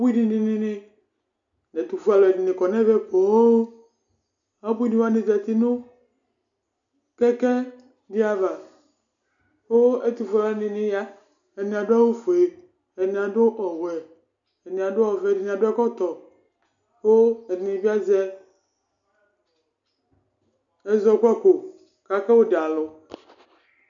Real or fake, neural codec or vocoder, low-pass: real; none; 7.2 kHz